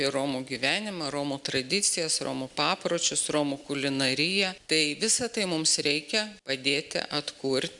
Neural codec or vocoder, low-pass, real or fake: none; 10.8 kHz; real